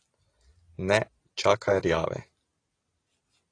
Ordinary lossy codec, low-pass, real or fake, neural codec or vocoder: AAC, 32 kbps; 9.9 kHz; real; none